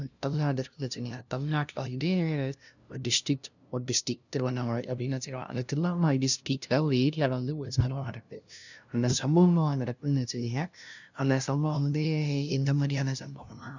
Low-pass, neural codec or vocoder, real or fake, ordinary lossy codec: 7.2 kHz; codec, 16 kHz, 0.5 kbps, FunCodec, trained on LibriTTS, 25 frames a second; fake; none